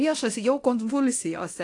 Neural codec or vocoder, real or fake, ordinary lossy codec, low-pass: codec, 24 kHz, 0.9 kbps, DualCodec; fake; AAC, 48 kbps; 10.8 kHz